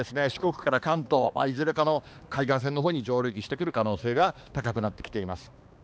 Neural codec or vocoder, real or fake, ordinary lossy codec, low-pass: codec, 16 kHz, 2 kbps, X-Codec, HuBERT features, trained on balanced general audio; fake; none; none